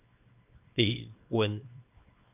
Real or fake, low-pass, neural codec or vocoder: fake; 3.6 kHz; codec, 24 kHz, 0.9 kbps, WavTokenizer, small release